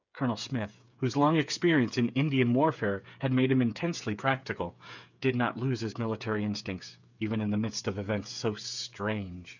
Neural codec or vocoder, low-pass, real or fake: codec, 16 kHz, 8 kbps, FreqCodec, smaller model; 7.2 kHz; fake